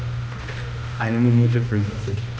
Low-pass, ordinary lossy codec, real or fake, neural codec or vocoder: none; none; fake; codec, 16 kHz, 1 kbps, X-Codec, HuBERT features, trained on balanced general audio